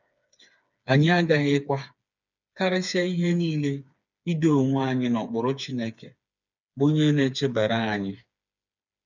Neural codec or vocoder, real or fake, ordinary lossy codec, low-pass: codec, 16 kHz, 4 kbps, FreqCodec, smaller model; fake; none; 7.2 kHz